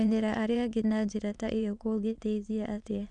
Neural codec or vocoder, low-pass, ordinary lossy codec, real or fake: autoencoder, 22.05 kHz, a latent of 192 numbers a frame, VITS, trained on many speakers; 9.9 kHz; none; fake